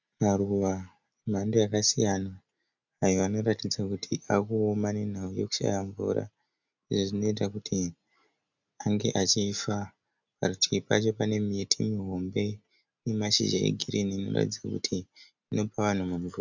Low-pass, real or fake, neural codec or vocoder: 7.2 kHz; real; none